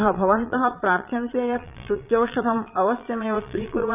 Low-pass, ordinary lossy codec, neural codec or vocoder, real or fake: 3.6 kHz; none; vocoder, 22.05 kHz, 80 mel bands, Vocos; fake